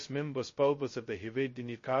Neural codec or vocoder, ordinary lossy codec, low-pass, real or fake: codec, 16 kHz, 0.2 kbps, FocalCodec; MP3, 32 kbps; 7.2 kHz; fake